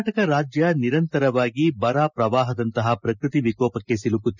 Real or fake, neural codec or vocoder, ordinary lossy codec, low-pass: real; none; none; 7.2 kHz